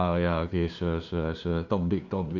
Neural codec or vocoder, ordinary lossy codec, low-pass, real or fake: codec, 16 kHz, 2 kbps, FunCodec, trained on LibriTTS, 25 frames a second; none; 7.2 kHz; fake